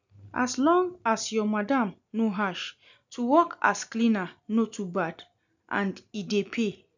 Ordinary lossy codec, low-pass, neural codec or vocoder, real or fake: none; 7.2 kHz; none; real